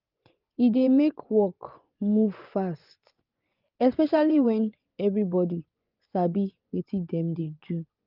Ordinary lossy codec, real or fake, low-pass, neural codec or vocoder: Opus, 32 kbps; fake; 5.4 kHz; vocoder, 24 kHz, 100 mel bands, Vocos